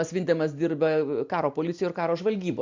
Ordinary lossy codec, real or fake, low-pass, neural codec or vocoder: MP3, 64 kbps; real; 7.2 kHz; none